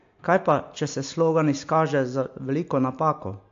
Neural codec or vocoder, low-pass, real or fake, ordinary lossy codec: none; 7.2 kHz; real; AAC, 48 kbps